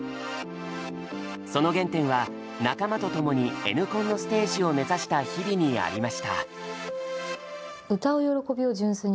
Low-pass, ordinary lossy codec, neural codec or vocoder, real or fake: none; none; none; real